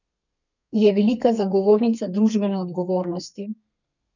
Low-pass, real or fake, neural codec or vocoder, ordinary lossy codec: 7.2 kHz; fake; codec, 32 kHz, 1.9 kbps, SNAC; none